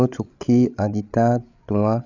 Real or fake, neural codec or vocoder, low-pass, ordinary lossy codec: fake; codec, 16 kHz, 8 kbps, FreqCodec, larger model; 7.2 kHz; none